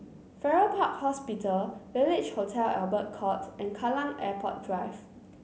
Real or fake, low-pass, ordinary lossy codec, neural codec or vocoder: real; none; none; none